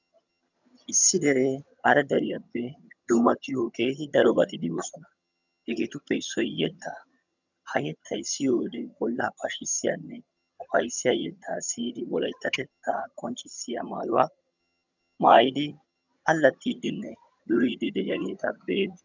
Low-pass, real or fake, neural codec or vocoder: 7.2 kHz; fake; vocoder, 22.05 kHz, 80 mel bands, HiFi-GAN